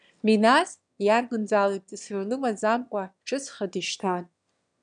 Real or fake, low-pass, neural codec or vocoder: fake; 9.9 kHz; autoencoder, 22.05 kHz, a latent of 192 numbers a frame, VITS, trained on one speaker